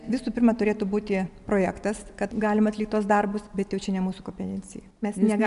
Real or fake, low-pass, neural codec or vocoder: real; 10.8 kHz; none